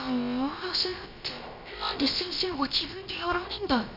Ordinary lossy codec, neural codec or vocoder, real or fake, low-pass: none; codec, 16 kHz, about 1 kbps, DyCAST, with the encoder's durations; fake; 5.4 kHz